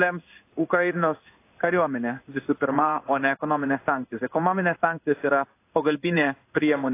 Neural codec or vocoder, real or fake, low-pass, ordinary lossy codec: codec, 16 kHz in and 24 kHz out, 1 kbps, XY-Tokenizer; fake; 3.6 kHz; AAC, 24 kbps